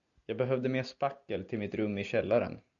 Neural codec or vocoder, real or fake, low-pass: none; real; 7.2 kHz